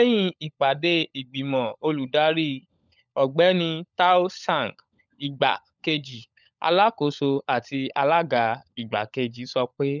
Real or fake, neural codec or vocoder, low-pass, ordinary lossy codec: fake; codec, 16 kHz, 16 kbps, FunCodec, trained on LibriTTS, 50 frames a second; 7.2 kHz; none